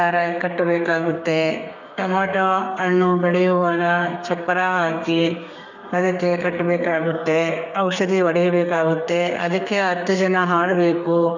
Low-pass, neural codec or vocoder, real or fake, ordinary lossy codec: 7.2 kHz; codec, 32 kHz, 1.9 kbps, SNAC; fake; none